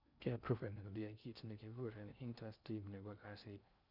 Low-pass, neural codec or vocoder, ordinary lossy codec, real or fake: 5.4 kHz; codec, 16 kHz in and 24 kHz out, 0.6 kbps, FocalCodec, streaming, 2048 codes; none; fake